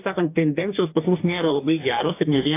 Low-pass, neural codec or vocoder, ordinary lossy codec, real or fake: 3.6 kHz; codec, 44.1 kHz, 2.6 kbps, DAC; AAC, 24 kbps; fake